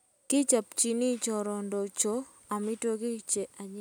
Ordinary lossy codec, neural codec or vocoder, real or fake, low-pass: none; none; real; none